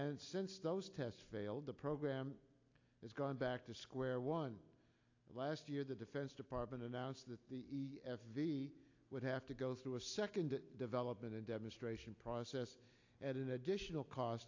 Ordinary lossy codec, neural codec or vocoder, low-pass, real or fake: AAC, 48 kbps; none; 7.2 kHz; real